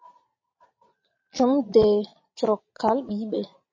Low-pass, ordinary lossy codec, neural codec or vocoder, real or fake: 7.2 kHz; MP3, 32 kbps; vocoder, 22.05 kHz, 80 mel bands, Vocos; fake